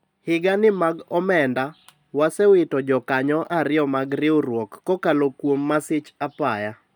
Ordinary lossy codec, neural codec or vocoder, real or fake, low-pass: none; none; real; none